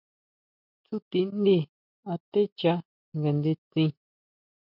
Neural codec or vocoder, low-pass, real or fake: none; 5.4 kHz; real